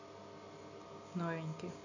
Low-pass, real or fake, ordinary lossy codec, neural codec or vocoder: 7.2 kHz; real; none; none